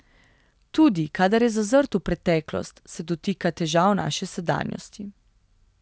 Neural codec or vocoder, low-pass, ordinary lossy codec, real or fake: none; none; none; real